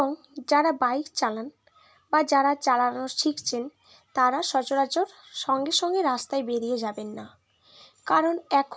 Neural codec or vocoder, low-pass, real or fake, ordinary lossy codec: none; none; real; none